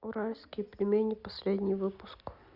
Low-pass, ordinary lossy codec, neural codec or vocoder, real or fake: 5.4 kHz; Opus, 64 kbps; none; real